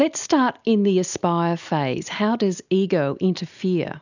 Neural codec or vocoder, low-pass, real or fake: none; 7.2 kHz; real